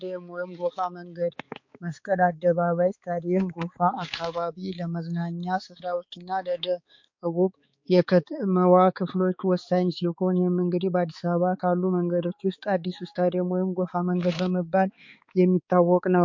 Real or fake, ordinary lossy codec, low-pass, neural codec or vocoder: fake; MP3, 48 kbps; 7.2 kHz; codec, 16 kHz, 4 kbps, X-Codec, HuBERT features, trained on balanced general audio